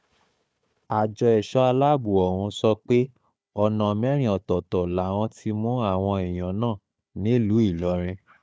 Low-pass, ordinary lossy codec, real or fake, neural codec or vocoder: none; none; fake; codec, 16 kHz, 4 kbps, FunCodec, trained on Chinese and English, 50 frames a second